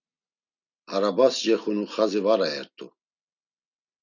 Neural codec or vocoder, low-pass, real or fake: none; 7.2 kHz; real